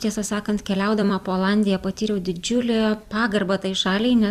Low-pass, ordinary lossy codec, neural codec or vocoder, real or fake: 14.4 kHz; Opus, 64 kbps; vocoder, 44.1 kHz, 128 mel bands every 256 samples, BigVGAN v2; fake